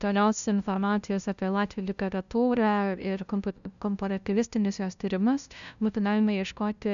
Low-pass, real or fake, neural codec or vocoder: 7.2 kHz; fake; codec, 16 kHz, 0.5 kbps, FunCodec, trained on LibriTTS, 25 frames a second